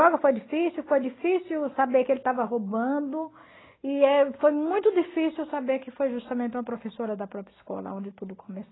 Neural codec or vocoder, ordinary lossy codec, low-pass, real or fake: none; AAC, 16 kbps; 7.2 kHz; real